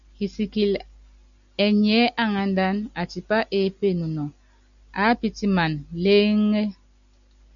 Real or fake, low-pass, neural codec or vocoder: real; 7.2 kHz; none